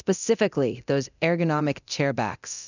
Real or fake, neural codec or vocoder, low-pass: fake; codec, 24 kHz, 0.9 kbps, DualCodec; 7.2 kHz